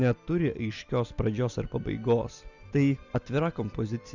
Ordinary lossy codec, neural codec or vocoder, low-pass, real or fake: Opus, 64 kbps; none; 7.2 kHz; real